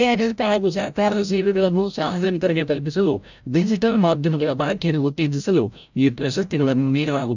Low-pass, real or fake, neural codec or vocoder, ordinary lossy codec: 7.2 kHz; fake; codec, 16 kHz, 0.5 kbps, FreqCodec, larger model; none